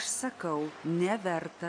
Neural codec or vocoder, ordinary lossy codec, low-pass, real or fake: none; AAC, 48 kbps; 9.9 kHz; real